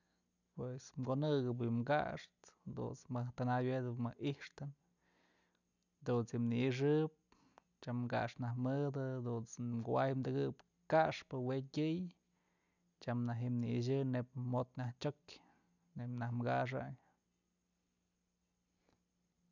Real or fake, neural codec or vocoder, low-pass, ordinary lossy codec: real; none; 7.2 kHz; none